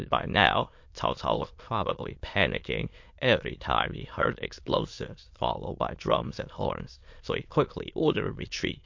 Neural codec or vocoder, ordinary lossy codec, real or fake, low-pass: autoencoder, 22.05 kHz, a latent of 192 numbers a frame, VITS, trained on many speakers; MP3, 48 kbps; fake; 7.2 kHz